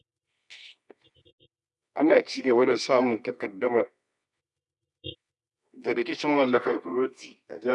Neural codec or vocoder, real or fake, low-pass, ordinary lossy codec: codec, 24 kHz, 0.9 kbps, WavTokenizer, medium music audio release; fake; 10.8 kHz; none